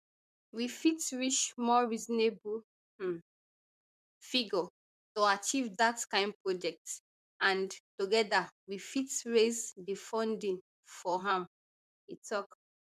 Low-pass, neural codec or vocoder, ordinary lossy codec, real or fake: 14.4 kHz; vocoder, 44.1 kHz, 128 mel bands, Pupu-Vocoder; MP3, 96 kbps; fake